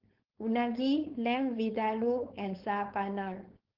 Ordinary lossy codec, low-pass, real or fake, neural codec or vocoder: Opus, 16 kbps; 5.4 kHz; fake; codec, 16 kHz, 4.8 kbps, FACodec